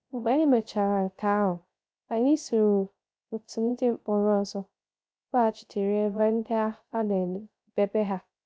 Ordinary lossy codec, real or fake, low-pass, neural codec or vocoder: none; fake; none; codec, 16 kHz, 0.3 kbps, FocalCodec